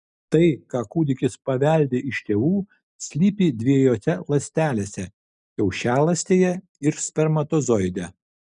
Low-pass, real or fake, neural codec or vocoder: 10.8 kHz; real; none